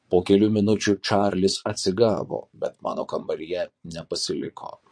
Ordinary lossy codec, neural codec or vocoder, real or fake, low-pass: MP3, 48 kbps; vocoder, 22.05 kHz, 80 mel bands, Vocos; fake; 9.9 kHz